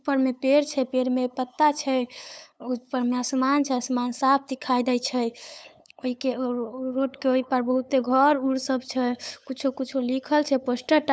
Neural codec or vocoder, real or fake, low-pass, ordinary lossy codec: codec, 16 kHz, 8 kbps, FunCodec, trained on LibriTTS, 25 frames a second; fake; none; none